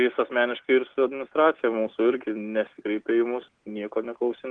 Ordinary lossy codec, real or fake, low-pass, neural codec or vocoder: Opus, 16 kbps; real; 9.9 kHz; none